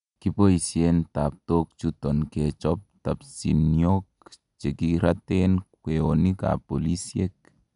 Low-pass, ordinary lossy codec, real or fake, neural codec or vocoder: 10.8 kHz; none; real; none